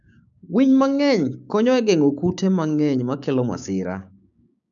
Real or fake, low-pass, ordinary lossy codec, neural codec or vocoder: fake; 7.2 kHz; none; codec, 16 kHz, 6 kbps, DAC